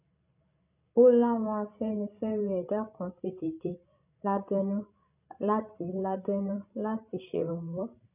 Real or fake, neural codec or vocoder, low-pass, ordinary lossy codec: fake; codec, 16 kHz, 16 kbps, FreqCodec, larger model; 3.6 kHz; none